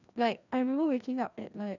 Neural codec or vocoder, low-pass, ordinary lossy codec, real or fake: codec, 16 kHz, 1 kbps, FreqCodec, larger model; 7.2 kHz; none; fake